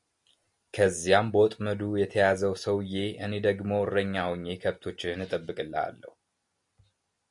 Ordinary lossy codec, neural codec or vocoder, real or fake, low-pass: MP3, 64 kbps; none; real; 10.8 kHz